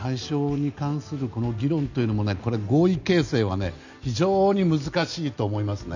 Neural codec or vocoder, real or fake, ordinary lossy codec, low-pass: none; real; none; 7.2 kHz